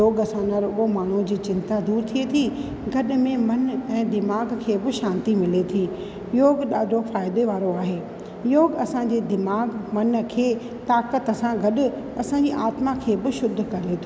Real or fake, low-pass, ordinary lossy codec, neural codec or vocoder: real; none; none; none